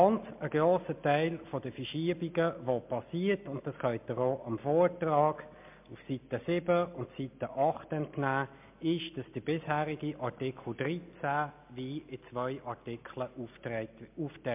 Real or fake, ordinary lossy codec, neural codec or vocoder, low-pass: real; MP3, 32 kbps; none; 3.6 kHz